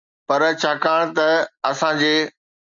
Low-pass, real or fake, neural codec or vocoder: 7.2 kHz; real; none